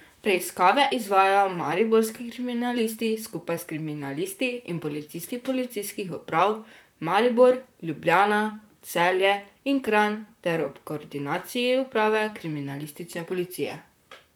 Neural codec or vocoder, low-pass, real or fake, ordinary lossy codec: vocoder, 44.1 kHz, 128 mel bands, Pupu-Vocoder; none; fake; none